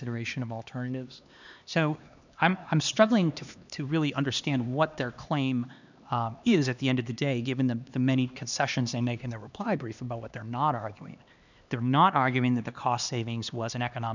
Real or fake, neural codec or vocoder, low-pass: fake; codec, 16 kHz, 2 kbps, X-Codec, HuBERT features, trained on LibriSpeech; 7.2 kHz